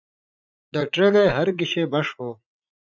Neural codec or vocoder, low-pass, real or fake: vocoder, 44.1 kHz, 80 mel bands, Vocos; 7.2 kHz; fake